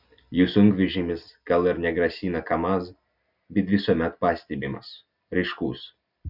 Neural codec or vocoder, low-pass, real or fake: none; 5.4 kHz; real